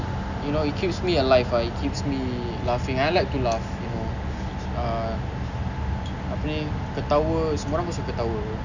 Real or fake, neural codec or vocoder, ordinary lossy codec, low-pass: real; none; none; 7.2 kHz